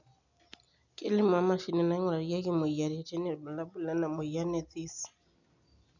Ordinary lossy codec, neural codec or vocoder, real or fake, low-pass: none; none; real; 7.2 kHz